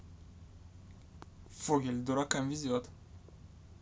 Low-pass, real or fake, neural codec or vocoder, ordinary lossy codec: none; real; none; none